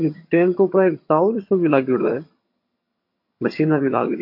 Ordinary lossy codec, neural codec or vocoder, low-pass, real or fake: MP3, 32 kbps; vocoder, 22.05 kHz, 80 mel bands, HiFi-GAN; 5.4 kHz; fake